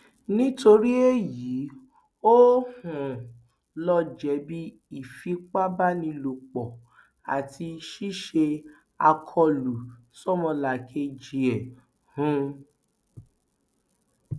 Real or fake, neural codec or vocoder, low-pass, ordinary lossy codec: real; none; none; none